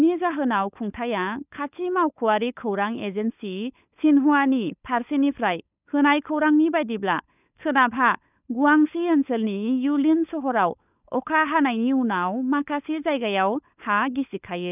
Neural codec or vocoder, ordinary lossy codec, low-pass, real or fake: codec, 16 kHz, 16 kbps, FunCodec, trained on LibriTTS, 50 frames a second; none; 3.6 kHz; fake